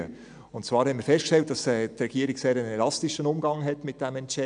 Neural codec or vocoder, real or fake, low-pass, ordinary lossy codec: none; real; 9.9 kHz; MP3, 96 kbps